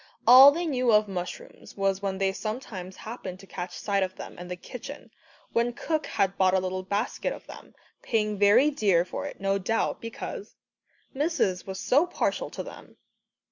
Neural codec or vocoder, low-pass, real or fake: none; 7.2 kHz; real